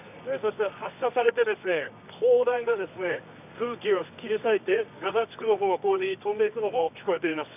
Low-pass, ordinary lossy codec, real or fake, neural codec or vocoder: 3.6 kHz; none; fake; codec, 24 kHz, 0.9 kbps, WavTokenizer, medium music audio release